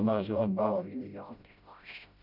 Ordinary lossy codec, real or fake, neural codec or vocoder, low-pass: none; fake; codec, 16 kHz, 0.5 kbps, FreqCodec, smaller model; 5.4 kHz